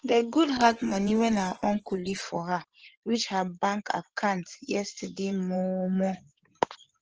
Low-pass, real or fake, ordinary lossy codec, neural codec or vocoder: 7.2 kHz; fake; Opus, 16 kbps; codec, 16 kHz in and 24 kHz out, 2.2 kbps, FireRedTTS-2 codec